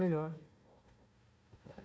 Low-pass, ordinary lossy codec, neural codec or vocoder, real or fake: none; none; codec, 16 kHz, 1 kbps, FunCodec, trained on Chinese and English, 50 frames a second; fake